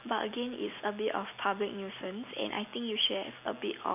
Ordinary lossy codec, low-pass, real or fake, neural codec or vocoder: none; 3.6 kHz; real; none